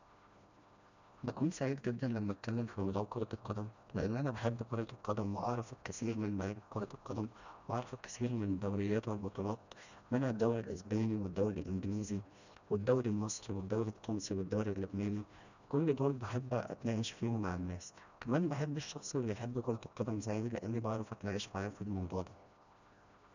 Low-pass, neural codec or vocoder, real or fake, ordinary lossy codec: 7.2 kHz; codec, 16 kHz, 1 kbps, FreqCodec, smaller model; fake; none